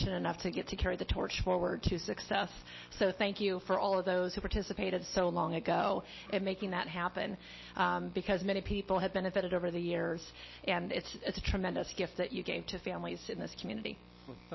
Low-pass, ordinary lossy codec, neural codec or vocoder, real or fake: 7.2 kHz; MP3, 24 kbps; none; real